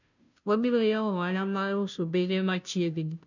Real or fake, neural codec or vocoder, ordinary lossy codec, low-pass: fake; codec, 16 kHz, 0.5 kbps, FunCodec, trained on Chinese and English, 25 frames a second; none; 7.2 kHz